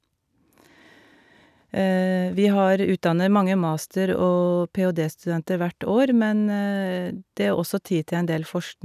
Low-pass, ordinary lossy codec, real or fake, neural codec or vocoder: 14.4 kHz; none; real; none